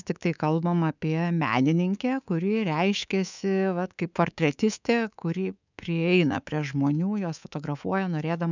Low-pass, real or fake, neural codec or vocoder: 7.2 kHz; fake; codec, 24 kHz, 3.1 kbps, DualCodec